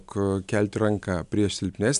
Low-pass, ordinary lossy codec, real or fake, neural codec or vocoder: 10.8 kHz; AAC, 96 kbps; real; none